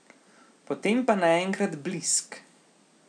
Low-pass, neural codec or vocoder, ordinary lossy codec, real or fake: 9.9 kHz; none; none; real